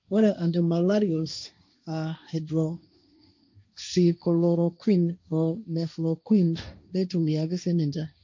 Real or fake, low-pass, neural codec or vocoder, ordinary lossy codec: fake; 7.2 kHz; codec, 16 kHz, 1.1 kbps, Voila-Tokenizer; MP3, 48 kbps